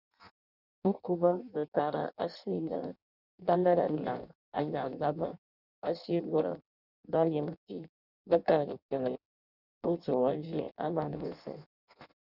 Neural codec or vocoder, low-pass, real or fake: codec, 16 kHz in and 24 kHz out, 0.6 kbps, FireRedTTS-2 codec; 5.4 kHz; fake